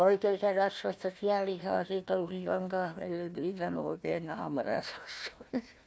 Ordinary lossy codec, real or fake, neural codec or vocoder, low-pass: none; fake; codec, 16 kHz, 1 kbps, FunCodec, trained on Chinese and English, 50 frames a second; none